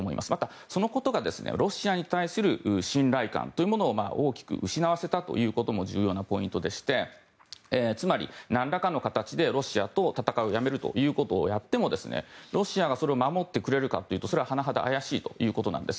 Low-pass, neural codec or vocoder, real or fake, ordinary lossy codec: none; none; real; none